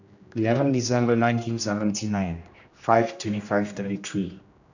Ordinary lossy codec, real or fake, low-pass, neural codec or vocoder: none; fake; 7.2 kHz; codec, 16 kHz, 1 kbps, X-Codec, HuBERT features, trained on general audio